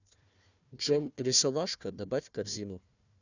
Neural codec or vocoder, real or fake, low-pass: codec, 16 kHz, 1 kbps, FunCodec, trained on Chinese and English, 50 frames a second; fake; 7.2 kHz